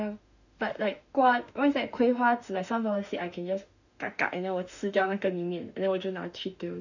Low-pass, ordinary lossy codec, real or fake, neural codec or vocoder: 7.2 kHz; none; fake; autoencoder, 48 kHz, 32 numbers a frame, DAC-VAE, trained on Japanese speech